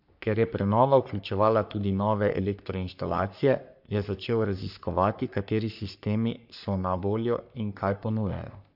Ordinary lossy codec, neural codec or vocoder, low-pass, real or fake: AAC, 48 kbps; codec, 44.1 kHz, 3.4 kbps, Pupu-Codec; 5.4 kHz; fake